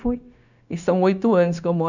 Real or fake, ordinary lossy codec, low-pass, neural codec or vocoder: fake; none; 7.2 kHz; codec, 16 kHz, 0.9 kbps, LongCat-Audio-Codec